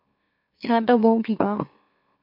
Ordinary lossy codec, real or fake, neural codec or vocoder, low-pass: MP3, 32 kbps; fake; autoencoder, 44.1 kHz, a latent of 192 numbers a frame, MeloTTS; 5.4 kHz